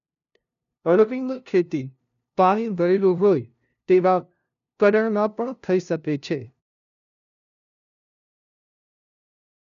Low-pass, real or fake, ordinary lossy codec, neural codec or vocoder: 7.2 kHz; fake; none; codec, 16 kHz, 0.5 kbps, FunCodec, trained on LibriTTS, 25 frames a second